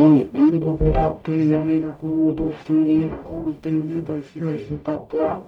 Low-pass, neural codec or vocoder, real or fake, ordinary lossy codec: 19.8 kHz; codec, 44.1 kHz, 0.9 kbps, DAC; fake; none